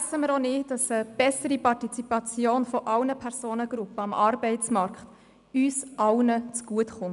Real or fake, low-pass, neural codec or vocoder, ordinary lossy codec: real; 10.8 kHz; none; none